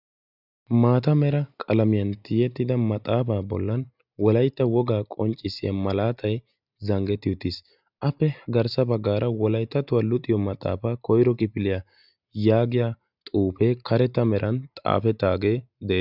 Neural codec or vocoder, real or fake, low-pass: none; real; 5.4 kHz